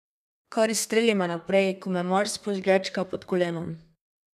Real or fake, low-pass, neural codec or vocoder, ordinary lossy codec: fake; 14.4 kHz; codec, 32 kHz, 1.9 kbps, SNAC; none